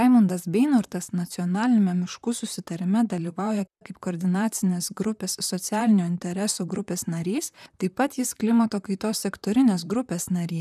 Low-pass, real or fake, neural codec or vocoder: 14.4 kHz; fake; vocoder, 44.1 kHz, 128 mel bands, Pupu-Vocoder